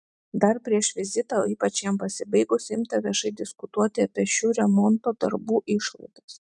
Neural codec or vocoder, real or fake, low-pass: none; real; 10.8 kHz